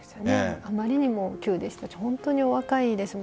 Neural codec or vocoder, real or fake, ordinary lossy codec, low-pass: none; real; none; none